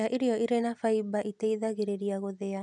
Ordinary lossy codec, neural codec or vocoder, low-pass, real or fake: none; none; 10.8 kHz; real